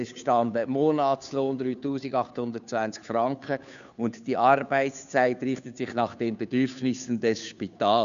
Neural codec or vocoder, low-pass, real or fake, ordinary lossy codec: codec, 16 kHz, 2 kbps, FunCodec, trained on Chinese and English, 25 frames a second; 7.2 kHz; fake; none